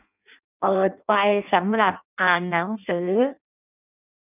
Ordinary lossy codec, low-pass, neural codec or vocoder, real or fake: none; 3.6 kHz; codec, 16 kHz in and 24 kHz out, 0.6 kbps, FireRedTTS-2 codec; fake